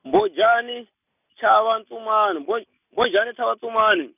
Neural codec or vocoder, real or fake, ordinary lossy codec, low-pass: none; real; none; 3.6 kHz